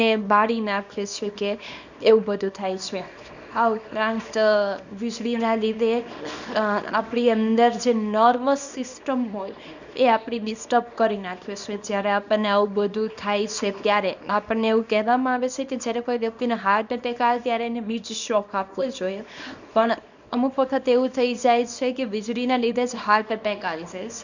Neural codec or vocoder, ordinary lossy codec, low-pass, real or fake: codec, 24 kHz, 0.9 kbps, WavTokenizer, small release; none; 7.2 kHz; fake